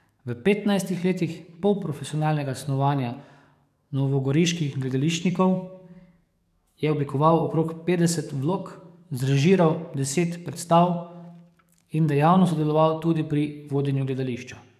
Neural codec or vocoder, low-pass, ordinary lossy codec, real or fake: codec, 44.1 kHz, 7.8 kbps, DAC; 14.4 kHz; none; fake